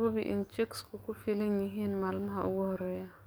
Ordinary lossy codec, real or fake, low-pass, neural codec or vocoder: none; fake; none; codec, 44.1 kHz, 7.8 kbps, DAC